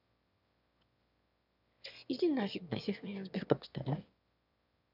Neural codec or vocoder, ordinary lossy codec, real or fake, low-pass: autoencoder, 22.05 kHz, a latent of 192 numbers a frame, VITS, trained on one speaker; none; fake; 5.4 kHz